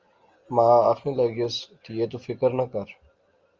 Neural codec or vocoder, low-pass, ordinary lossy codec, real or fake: none; 7.2 kHz; Opus, 32 kbps; real